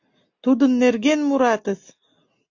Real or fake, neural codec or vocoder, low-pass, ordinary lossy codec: real; none; 7.2 kHz; AAC, 48 kbps